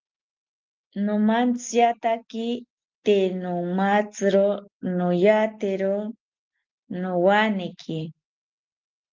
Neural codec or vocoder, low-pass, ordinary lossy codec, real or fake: none; 7.2 kHz; Opus, 24 kbps; real